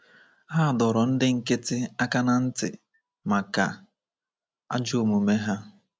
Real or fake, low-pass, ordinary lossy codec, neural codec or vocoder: real; none; none; none